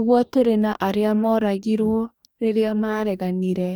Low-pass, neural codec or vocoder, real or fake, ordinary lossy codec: none; codec, 44.1 kHz, 2.6 kbps, DAC; fake; none